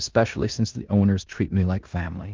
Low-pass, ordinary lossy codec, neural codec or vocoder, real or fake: 7.2 kHz; Opus, 32 kbps; codec, 16 kHz in and 24 kHz out, 0.9 kbps, LongCat-Audio-Codec, four codebook decoder; fake